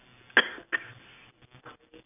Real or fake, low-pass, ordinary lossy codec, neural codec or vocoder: real; 3.6 kHz; none; none